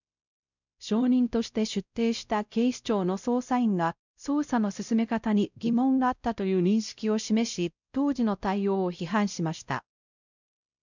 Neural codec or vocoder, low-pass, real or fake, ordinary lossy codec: codec, 16 kHz, 0.5 kbps, X-Codec, WavLM features, trained on Multilingual LibriSpeech; 7.2 kHz; fake; none